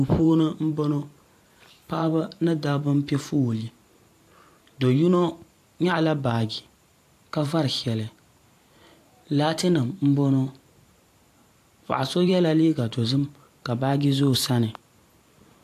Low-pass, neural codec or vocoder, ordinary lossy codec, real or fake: 14.4 kHz; vocoder, 44.1 kHz, 128 mel bands every 512 samples, BigVGAN v2; AAC, 64 kbps; fake